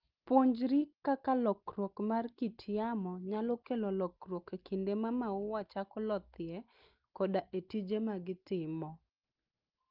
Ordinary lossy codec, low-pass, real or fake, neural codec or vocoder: Opus, 32 kbps; 5.4 kHz; real; none